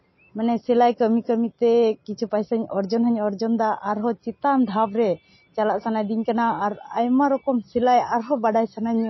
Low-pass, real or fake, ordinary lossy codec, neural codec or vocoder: 7.2 kHz; real; MP3, 24 kbps; none